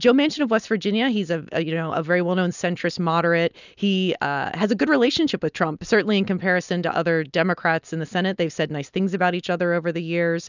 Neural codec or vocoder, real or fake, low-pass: none; real; 7.2 kHz